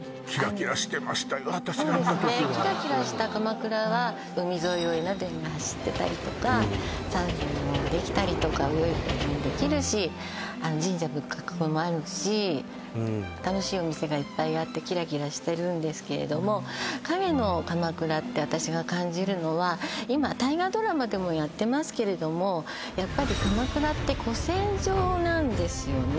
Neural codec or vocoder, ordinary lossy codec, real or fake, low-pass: none; none; real; none